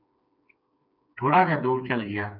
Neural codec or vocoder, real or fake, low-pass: codec, 16 kHz, 4 kbps, FreqCodec, smaller model; fake; 5.4 kHz